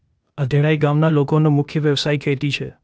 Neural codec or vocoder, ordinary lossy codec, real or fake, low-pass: codec, 16 kHz, 0.8 kbps, ZipCodec; none; fake; none